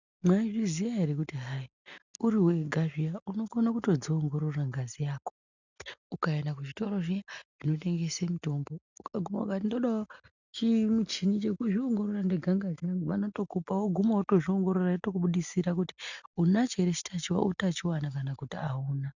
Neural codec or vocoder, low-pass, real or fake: none; 7.2 kHz; real